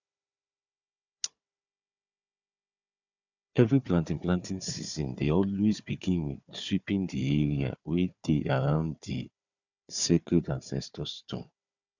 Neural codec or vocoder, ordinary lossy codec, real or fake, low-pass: codec, 16 kHz, 4 kbps, FunCodec, trained on Chinese and English, 50 frames a second; none; fake; 7.2 kHz